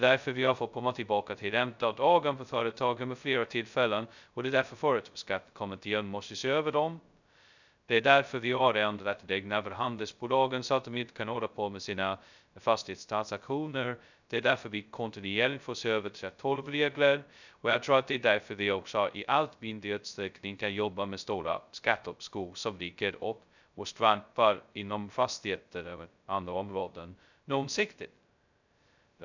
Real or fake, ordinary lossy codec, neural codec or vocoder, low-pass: fake; none; codec, 16 kHz, 0.2 kbps, FocalCodec; 7.2 kHz